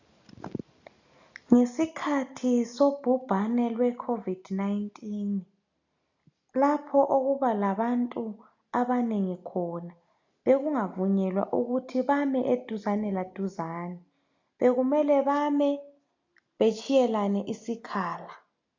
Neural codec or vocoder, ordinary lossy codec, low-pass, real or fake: none; AAC, 48 kbps; 7.2 kHz; real